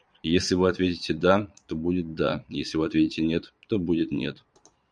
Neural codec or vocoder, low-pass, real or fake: vocoder, 22.05 kHz, 80 mel bands, Vocos; 9.9 kHz; fake